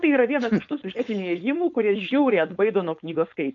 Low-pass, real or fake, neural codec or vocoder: 7.2 kHz; fake; codec, 16 kHz, 4.8 kbps, FACodec